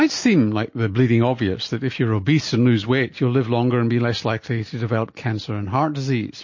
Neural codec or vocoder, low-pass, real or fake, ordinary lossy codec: none; 7.2 kHz; real; MP3, 32 kbps